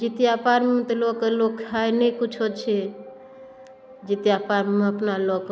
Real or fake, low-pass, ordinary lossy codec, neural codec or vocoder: real; none; none; none